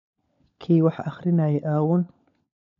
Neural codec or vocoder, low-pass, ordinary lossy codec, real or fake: codec, 16 kHz, 16 kbps, FunCodec, trained on LibriTTS, 50 frames a second; 7.2 kHz; none; fake